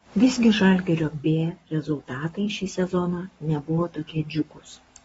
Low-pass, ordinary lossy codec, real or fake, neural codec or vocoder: 19.8 kHz; AAC, 24 kbps; fake; codec, 44.1 kHz, 7.8 kbps, Pupu-Codec